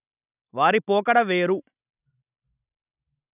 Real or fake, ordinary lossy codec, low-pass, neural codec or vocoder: real; none; 3.6 kHz; none